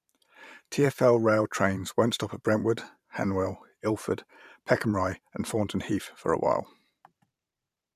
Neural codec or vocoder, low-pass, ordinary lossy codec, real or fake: vocoder, 44.1 kHz, 128 mel bands every 512 samples, BigVGAN v2; 14.4 kHz; none; fake